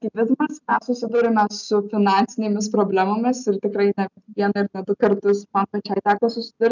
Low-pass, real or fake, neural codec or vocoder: 7.2 kHz; real; none